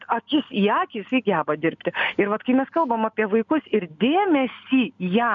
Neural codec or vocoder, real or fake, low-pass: none; real; 7.2 kHz